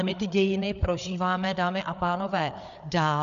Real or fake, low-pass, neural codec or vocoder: fake; 7.2 kHz; codec, 16 kHz, 4 kbps, FreqCodec, larger model